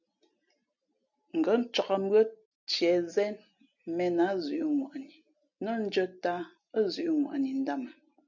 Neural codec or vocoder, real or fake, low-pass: none; real; 7.2 kHz